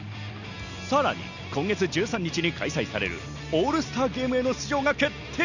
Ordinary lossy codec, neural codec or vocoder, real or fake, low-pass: none; none; real; 7.2 kHz